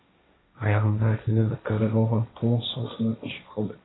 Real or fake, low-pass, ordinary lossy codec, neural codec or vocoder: fake; 7.2 kHz; AAC, 16 kbps; codec, 16 kHz, 1.1 kbps, Voila-Tokenizer